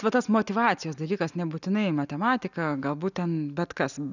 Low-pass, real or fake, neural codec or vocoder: 7.2 kHz; real; none